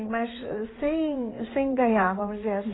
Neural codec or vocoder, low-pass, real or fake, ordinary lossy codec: codec, 16 kHz in and 24 kHz out, 2.2 kbps, FireRedTTS-2 codec; 7.2 kHz; fake; AAC, 16 kbps